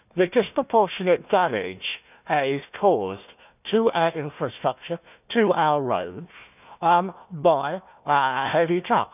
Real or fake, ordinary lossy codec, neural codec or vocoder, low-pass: fake; none; codec, 16 kHz, 1 kbps, FunCodec, trained on Chinese and English, 50 frames a second; 3.6 kHz